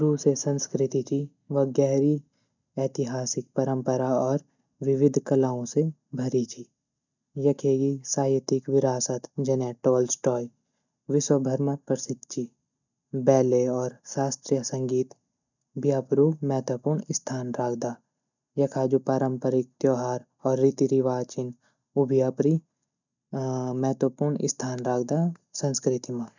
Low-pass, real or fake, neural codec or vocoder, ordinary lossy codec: 7.2 kHz; real; none; none